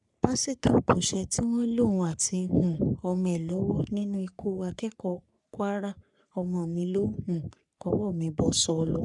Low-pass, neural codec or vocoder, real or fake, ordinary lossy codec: 10.8 kHz; codec, 44.1 kHz, 3.4 kbps, Pupu-Codec; fake; none